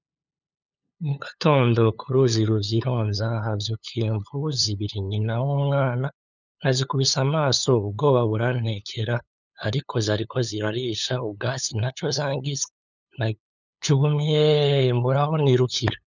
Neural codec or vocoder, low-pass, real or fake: codec, 16 kHz, 8 kbps, FunCodec, trained on LibriTTS, 25 frames a second; 7.2 kHz; fake